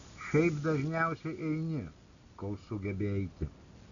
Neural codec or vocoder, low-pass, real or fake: none; 7.2 kHz; real